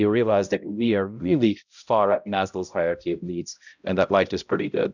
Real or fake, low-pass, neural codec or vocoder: fake; 7.2 kHz; codec, 16 kHz, 0.5 kbps, X-Codec, HuBERT features, trained on balanced general audio